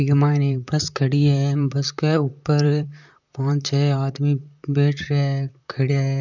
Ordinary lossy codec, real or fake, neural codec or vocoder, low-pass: none; fake; vocoder, 44.1 kHz, 128 mel bands, Pupu-Vocoder; 7.2 kHz